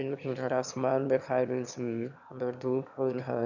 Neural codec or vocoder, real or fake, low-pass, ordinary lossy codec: autoencoder, 22.05 kHz, a latent of 192 numbers a frame, VITS, trained on one speaker; fake; 7.2 kHz; none